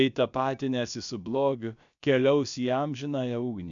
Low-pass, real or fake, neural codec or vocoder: 7.2 kHz; fake; codec, 16 kHz, about 1 kbps, DyCAST, with the encoder's durations